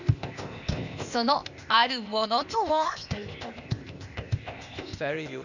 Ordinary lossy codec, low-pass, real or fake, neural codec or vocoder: none; 7.2 kHz; fake; codec, 16 kHz, 0.8 kbps, ZipCodec